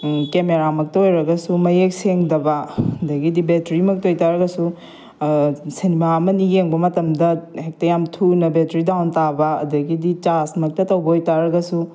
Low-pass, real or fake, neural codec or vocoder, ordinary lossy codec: none; real; none; none